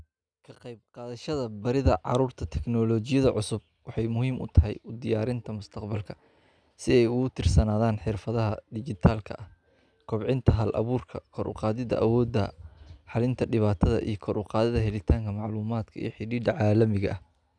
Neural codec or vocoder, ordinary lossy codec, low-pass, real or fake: none; none; 9.9 kHz; real